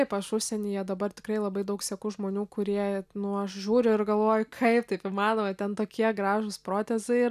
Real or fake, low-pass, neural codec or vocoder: real; 14.4 kHz; none